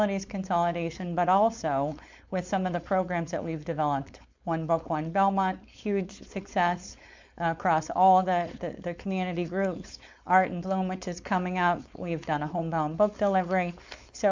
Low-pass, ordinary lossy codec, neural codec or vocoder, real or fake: 7.2 kHz; MP3, 64 kbps; codec, 16 kHz, 4.8 kbps, FACodec; fake